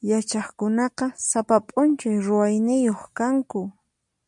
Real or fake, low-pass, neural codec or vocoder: real; 10.8 kHz; none